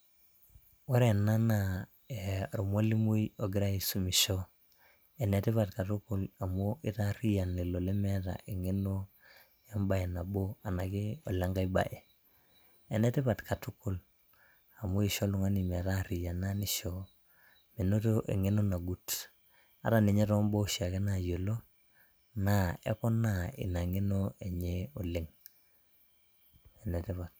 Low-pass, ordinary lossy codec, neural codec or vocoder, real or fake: none; none; none; real